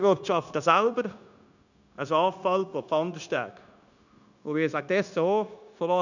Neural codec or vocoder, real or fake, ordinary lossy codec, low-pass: codec, 16 kHz, 0.9 kbps, LongCat-Audio-Codec; fake; none; 7.2 kHz